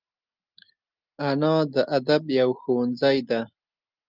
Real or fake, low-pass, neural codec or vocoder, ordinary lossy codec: real; 5.4 kHz; none; Opus, 24 kbps